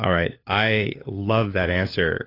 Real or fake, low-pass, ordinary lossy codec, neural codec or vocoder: fake; 5.4 kHz; AAC, 24 kbps; codec, 16 kHz, 4 kbps, FunCodec, trained on LibriTTS, 50 frames a second